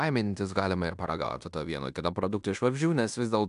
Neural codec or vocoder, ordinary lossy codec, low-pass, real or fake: codec, 16 kHz in and 24 kHz out, 0.9 kbps, LongCat-Audio-Codec, fine tuned four codebook decoder; AAC, 96 kbps; 10.8 kHz; fake